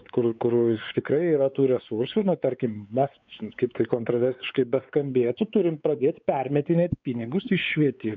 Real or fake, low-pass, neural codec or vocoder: fake; 7.2 kHz; codec, 16 kHz, 4 kbps, X-Codec, WavLM features, trained on Multilingual LibriSpeech